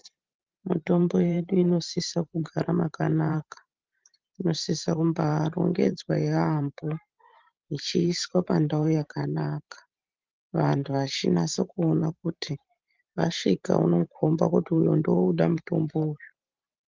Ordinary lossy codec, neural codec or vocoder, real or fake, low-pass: Opus, 32 kbps; vocoder, 44.1 kHz, 128 mel bands every 512 samples, BigVGAN v2; fake; 7.2 kHz